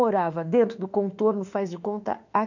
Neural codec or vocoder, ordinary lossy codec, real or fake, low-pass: autoencoder, 48 kHz, 32 numbers a frame, DAC-VAE, trained on Japanese speech; none; fake; 7.2 kHz